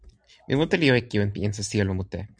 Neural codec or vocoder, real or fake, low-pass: none; real; 9.9 kHz